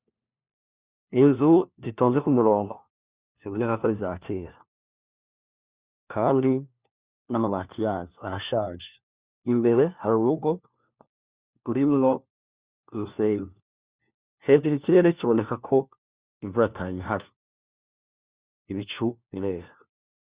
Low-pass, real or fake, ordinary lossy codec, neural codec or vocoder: 3.6 kHz; fake; Opus, 64 kbps; codec, 16 kHz, 1 kbps, FunCodec, trained on LibriTTS, 50 frames a second